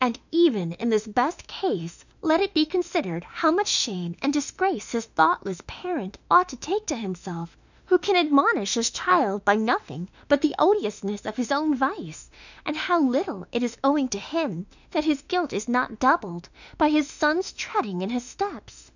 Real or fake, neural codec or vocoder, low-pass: fake; autoencoder, 48 kHz, 32 numbers a frame, DAC-VAE, trained on Japanese speech; 7.2 kHz